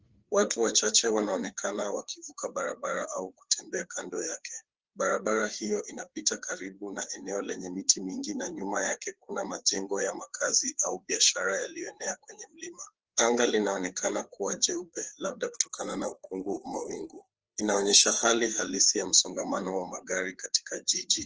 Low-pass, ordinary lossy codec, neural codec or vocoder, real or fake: 7.2 kHz; Opus, 16 kbps; codec, 16 kHz, 4 kbps, FreqCodec, larger model; fake